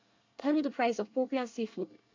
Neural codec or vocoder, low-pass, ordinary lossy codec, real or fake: codec, 24 kHz, 1 kbps, SNAC; 7.2 kHz; MP3, 48 kbps; fake